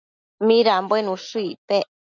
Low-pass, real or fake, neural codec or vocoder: 7.2 kHz; real; none